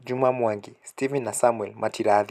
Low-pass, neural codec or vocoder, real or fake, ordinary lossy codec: 14.4 kHz; none; real; none